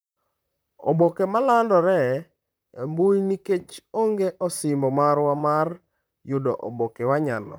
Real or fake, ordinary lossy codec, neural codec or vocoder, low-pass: fake; none; vocoder, 44.1 kHz, 128 mel bands, Pupu-Vocoder; none